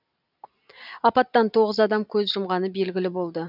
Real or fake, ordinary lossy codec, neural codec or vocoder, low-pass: real; none; none; 5.4 kHz